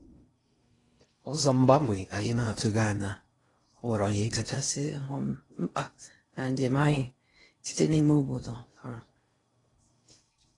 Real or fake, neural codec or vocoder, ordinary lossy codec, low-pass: fake; codec, 16 kHz in and 24 kHz out, 0.6 kbps, FocalCodec, streaming, 4096 codes; AAC, 32 kbps; 10.8 kHz